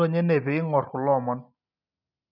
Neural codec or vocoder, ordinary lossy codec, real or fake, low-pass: none; none; real; 5.4 kHz